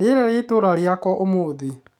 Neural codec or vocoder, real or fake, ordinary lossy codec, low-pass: codec, 44.1 kHz, 7.8 kbps, DAC; fake; none; 19.8 kHz